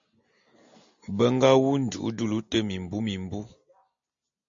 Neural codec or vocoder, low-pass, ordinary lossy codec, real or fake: none; 7.2 kHz; AAC, 64 kbps; real